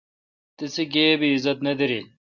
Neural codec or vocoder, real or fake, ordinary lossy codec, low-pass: none; real; Opus, 64 kbps; 7.2 kHz